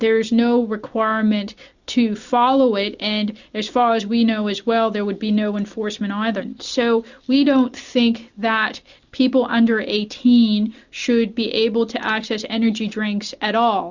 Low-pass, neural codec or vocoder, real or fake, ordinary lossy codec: 7.2 kHz; none; real; Opus, 64 kbps